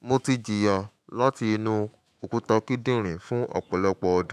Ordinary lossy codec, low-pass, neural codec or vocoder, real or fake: none; 14.4 kHz; autoencoder, 48 kHz, 128 numbers a frame, DAC-VAE, trained on Japanese speech; fake